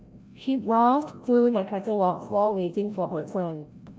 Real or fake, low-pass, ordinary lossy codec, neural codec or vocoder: fake; none; none; codec, 16 kHz, 0.5 kbps, FreqCodec, larger model